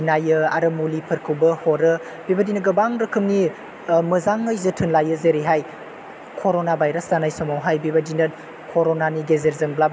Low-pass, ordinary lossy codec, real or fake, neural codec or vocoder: none; none; real; none